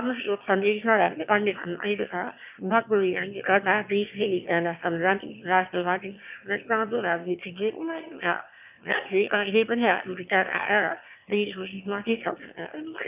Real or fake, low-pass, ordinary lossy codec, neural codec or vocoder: fake; 3.6 kHz; none; autoencoder, 22.05 kHz, a latent of 192 numbers a frame, VITS, trained on one speaker